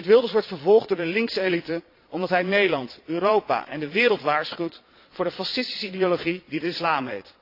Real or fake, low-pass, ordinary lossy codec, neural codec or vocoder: fake; 5.4 kHz; AAC, 32 kbps; vocoder, 22.05 kHz, 80 mel bands, Vocos